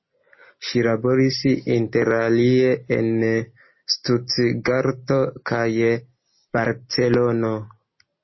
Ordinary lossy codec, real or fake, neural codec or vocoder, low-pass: MP3, 24 kbps; real; none; 7.2 kHz